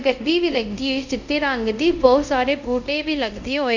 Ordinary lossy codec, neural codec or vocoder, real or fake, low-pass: none; codec, 24 kHz, 0.5 kbps, DualCodec; fake; 7.2 kHz